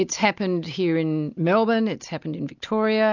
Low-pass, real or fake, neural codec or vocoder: 7.2 kHz; real; none